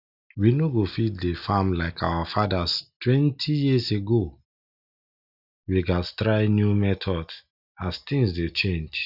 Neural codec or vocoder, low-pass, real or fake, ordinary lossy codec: none; 5.4 kHz; real; none